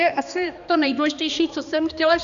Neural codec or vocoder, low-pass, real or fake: codec, 16 kHz, 2 kbps, X-Codec, HuBERT features, trained on balanced general audio; 7.2 kHz; fake